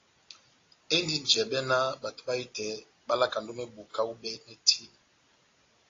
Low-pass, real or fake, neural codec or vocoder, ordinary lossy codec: 7.2 kHz; real; none; AAC, 32 kbps